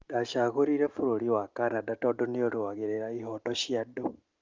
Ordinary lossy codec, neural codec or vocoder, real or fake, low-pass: Opus, 32 kbps; none; real; 7.2 kHz